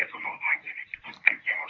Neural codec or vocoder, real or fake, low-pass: codec, 24 kHz, 0.9 kbps, WavTokenizer, medium speech release version 1; fake; 7.2 kHz